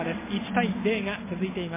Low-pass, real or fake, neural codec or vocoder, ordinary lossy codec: 3.6 kHz; real; none; MP3, 16 kbps